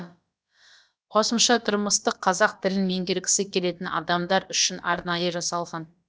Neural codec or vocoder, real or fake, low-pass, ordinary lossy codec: codec, 16 kHz, about 1 kbps, DyCAST, with the encoder's durations; fake; none; none